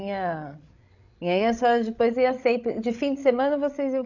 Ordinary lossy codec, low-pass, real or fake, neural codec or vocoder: none; 7.2 kHz; fake; codec, 16 kHz, 16 kbps, FreqCodec, larger model